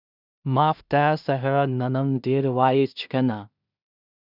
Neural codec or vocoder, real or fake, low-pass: codec, 16 kHz in and 24 kHz out, 0.4 kbps, LongCat-Audio-Codec, two codebook decoder; fake; 5.4 kHz